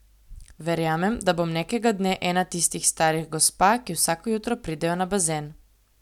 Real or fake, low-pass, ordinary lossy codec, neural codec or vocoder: real; 19.8 kHz; none; none